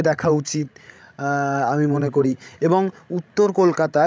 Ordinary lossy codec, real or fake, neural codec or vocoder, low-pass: none; fake; codec, 16 kHz, 16 kbps, FreqCodec, larger model; none